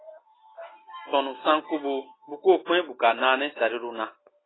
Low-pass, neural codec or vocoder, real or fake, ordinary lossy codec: 7.2 kHz; none; real; AAC, 16 kbps